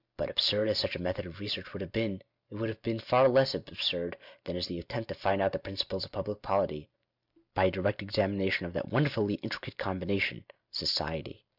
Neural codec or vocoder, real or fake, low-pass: none; real; 5.4 kHz